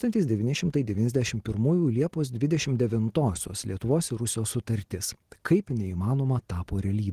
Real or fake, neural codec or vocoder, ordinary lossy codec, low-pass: real; none; Opus, 16 kbps; 14.4 kHz